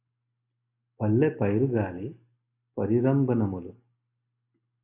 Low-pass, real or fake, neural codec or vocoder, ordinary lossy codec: 3.6 kHz; real; none; AAC, 32 kbps